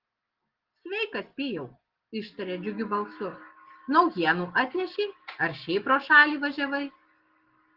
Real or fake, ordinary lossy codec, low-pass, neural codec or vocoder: real; Opus, 16 kbps; 5.4 kHz; none